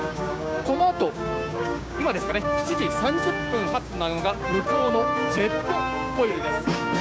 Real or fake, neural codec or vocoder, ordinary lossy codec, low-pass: fake; codec, 16 kHz, 6 kbps, DAC; none; none